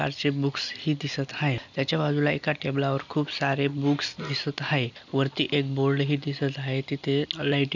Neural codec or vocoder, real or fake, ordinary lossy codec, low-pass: none; real; none; 7.2 kHz